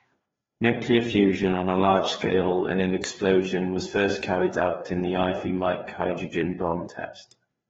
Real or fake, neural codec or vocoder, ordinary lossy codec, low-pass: fake; codec, 16 kHz, 2 kbps, FreqCodec, larger model; AAC, 24 kbps; 7.2 kHz